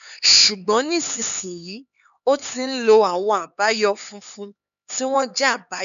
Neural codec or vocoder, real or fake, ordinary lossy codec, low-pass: codec, 16 kHz, 4 kbps, X-Codec, HuBERT features, trained on LibriSpeech; fake; none; 7.2 kHz